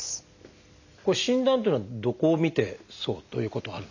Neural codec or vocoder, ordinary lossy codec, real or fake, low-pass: none; none; real; 7.2 kHz